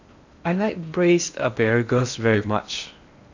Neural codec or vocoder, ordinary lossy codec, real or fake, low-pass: codec, 16 kHz in and 24 kHz out, 0.8 kbps, FocalCodec, streaming, 65536 codes; MP3, 64 kbps; fake; 7.2 kHz